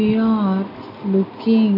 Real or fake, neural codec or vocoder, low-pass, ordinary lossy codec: real; none; 5.4 kHz; AAC, 32 kbps